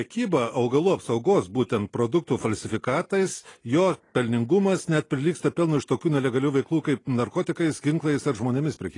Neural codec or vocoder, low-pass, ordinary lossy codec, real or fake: none; 10.8 kHz; AAC, 32 kbps; real